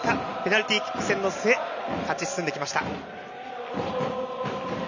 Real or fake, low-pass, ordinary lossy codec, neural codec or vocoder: real; 7.2 kHz; none; none